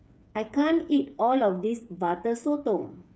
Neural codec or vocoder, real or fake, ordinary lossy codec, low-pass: codec, 16 kHz, 8 kbps, FreqCodec, smaller model; fake; none; none